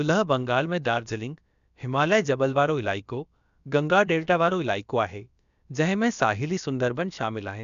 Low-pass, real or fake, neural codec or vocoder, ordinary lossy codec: 7.2 kHz; fake; codec, 16 kHz, about 1 kbps, DyCAST, with the encoder's durations; none